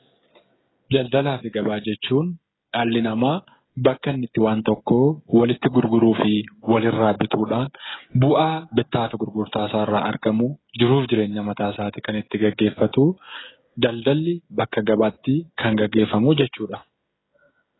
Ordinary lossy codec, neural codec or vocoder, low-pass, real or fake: AAC, 16 kbps; codec, 16 kHz, 16 kbps, FreqCodec, smaller model; 7.2 kHz; fake